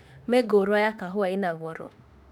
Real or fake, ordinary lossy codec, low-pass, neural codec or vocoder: fake; none; 19.8 kHz; autoencoder, 48 kHz, 32 numbers a frame, DAC-VAE, trained on Japanese speech